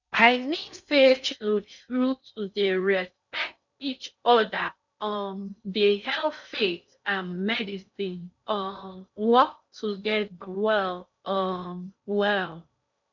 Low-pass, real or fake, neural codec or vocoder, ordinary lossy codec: 7.2 kHz; fake; codec, 16 kHz in and 24 kHz out, 0.6 kbps, FocalCodec, streaming, 4096 codes; none